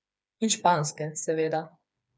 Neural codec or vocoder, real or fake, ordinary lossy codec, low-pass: codec, 16 kHz, 4 kbps, FreqCodec, smaller model; fake; none; none